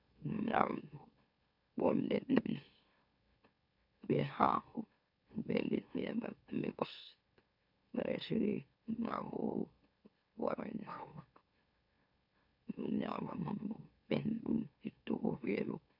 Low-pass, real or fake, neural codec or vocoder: 5.4 kHz; fake; autoencoder, 44.1 kHz, a latent of 192 numbers a frame, MeloTTS